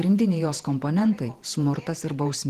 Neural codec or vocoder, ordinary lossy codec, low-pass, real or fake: vocoder, 48 kHz, 128 mel bands, Vocos; Opus, 16 kbps; 14.4 kHz; fake